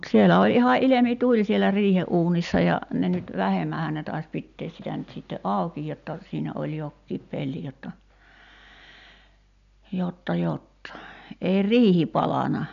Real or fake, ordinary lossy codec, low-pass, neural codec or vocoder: real; none; 7.2 kHz; none